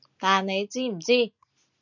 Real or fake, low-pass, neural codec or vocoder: real; 7.2 kHz; none